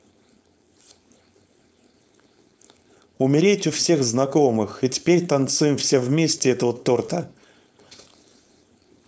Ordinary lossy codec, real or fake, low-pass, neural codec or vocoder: none; fake; none; codec, 16 kHz, 4.8 kbps, FACodec